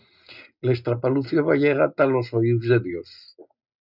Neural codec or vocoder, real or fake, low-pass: none; real; 5.4 kHz